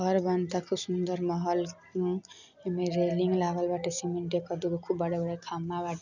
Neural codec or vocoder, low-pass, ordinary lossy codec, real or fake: none; 7.2 kHz; none; real